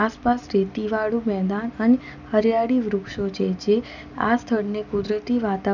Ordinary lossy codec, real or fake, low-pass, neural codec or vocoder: none; real; 7.2 kHz; none